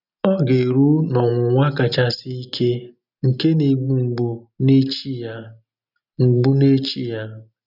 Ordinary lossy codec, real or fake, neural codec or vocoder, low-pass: none; real; none; 5.4 kHz